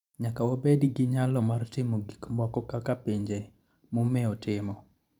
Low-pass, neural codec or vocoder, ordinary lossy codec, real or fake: 19.8 kHz; vocoder, 44.1 kHz, 128 mel bands every 512 samples, BigVGAN v2; none; fake